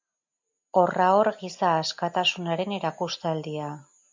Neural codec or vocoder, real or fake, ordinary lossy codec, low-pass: none; real; MP3, 64 kbps; 7.2 kHz